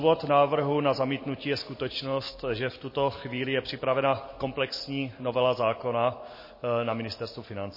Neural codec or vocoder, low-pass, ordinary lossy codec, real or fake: none; 5.4 kHz; MP3, 24 kbps; real